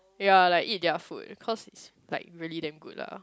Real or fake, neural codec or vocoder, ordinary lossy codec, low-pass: real; none; none; none